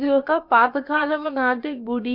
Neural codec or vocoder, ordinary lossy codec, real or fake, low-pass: codec, 16 kHz, about 1 kbps, DyCAST, with the encoder's durations; none; fake; 5.4 kHz